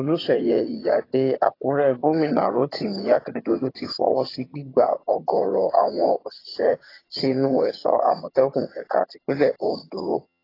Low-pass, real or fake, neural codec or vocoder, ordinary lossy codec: 5.4 kHz; fake; vocoder, 22.05 kHz, 80 mel bands, HiFi-GAN; AAC, 24 kbps